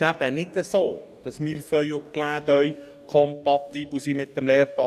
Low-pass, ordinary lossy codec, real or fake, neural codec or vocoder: 14.4 kHz; none; fake; codec, 44.1 kHz, 2.6 kbps, DAC